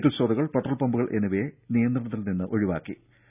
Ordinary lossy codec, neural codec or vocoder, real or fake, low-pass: none; none; real; 3.6 kHz